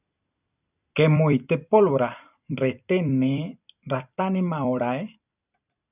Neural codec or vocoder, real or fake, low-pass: vocoder, 44.1 kHz, 128 mel bands every 256 samples, BigVGAN v2; fake; 3.6 kHz